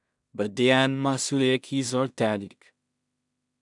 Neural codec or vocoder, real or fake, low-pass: codec, 16 kHz in and 24 kHz out, 0.4 kbps, LongCat-Audio-Codec, two codebook decoder; fake; 10.8 kHz